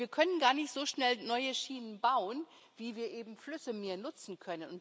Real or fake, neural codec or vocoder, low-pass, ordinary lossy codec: real; none; none; none